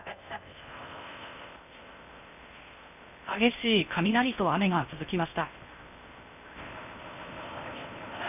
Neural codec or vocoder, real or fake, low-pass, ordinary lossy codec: codec, 16 kHz in and 24 kHz out, 0.6 kbps, FocalCodec, streaming, 2048 codes; fake; 3.6 kHz; none